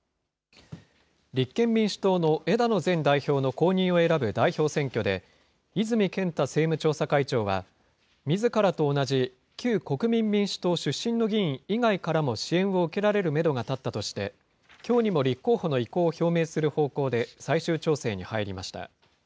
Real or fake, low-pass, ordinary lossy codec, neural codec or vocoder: real; none; none; none